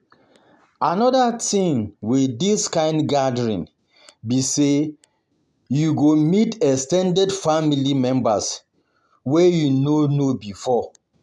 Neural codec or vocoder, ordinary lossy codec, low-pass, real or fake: none; none; none; real